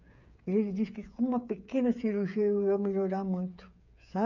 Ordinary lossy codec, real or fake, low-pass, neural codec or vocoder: none; fake; 7.2 kHz; codec, 16 kHz, 8 kbps, FreqCodec, smaller model